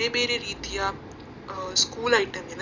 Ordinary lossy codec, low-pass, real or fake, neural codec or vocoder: none; 7.2 kHz; real; none